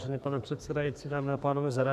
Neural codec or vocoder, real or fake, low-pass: codec, 32 kHz, 1.9 kbps, SNAC; fake; 14.4 kHz